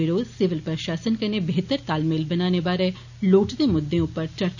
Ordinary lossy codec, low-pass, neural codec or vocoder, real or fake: none; 7.2 kHz; none; real